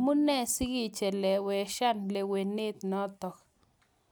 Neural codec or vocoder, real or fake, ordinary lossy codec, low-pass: none; real; none; none